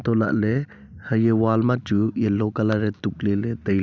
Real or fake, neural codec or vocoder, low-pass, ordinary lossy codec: real; none; none; none